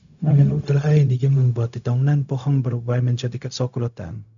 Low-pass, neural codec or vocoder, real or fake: 7.2 kHz; codec, 16 kHz, 0.4 kbps, LongCat-Audio-Codec; fake